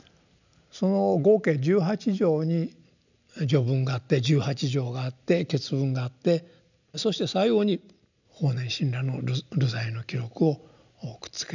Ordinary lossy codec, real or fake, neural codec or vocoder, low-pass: none; real; none; 7.2 kHz